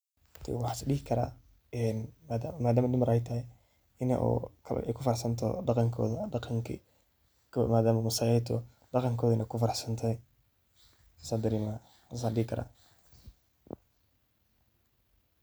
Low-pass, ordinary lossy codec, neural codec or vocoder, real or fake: none; none; none; real